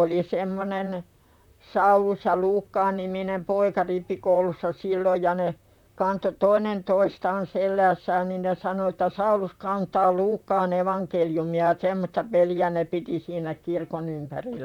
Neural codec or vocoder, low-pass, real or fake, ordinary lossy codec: vocoder, 44.1 kHz, 128 mel bands, Pupu-Vocoder; 19.8 kHz; fake; none